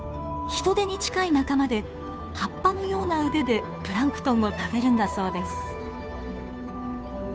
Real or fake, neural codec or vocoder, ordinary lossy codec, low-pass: fake; codec, 16 kHz, 2 kbps, FunCodec, trained on Chinese and English, 25 frames a second; none; none